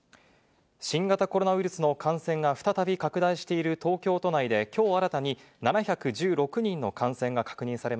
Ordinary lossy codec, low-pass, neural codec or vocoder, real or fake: none; none; none; real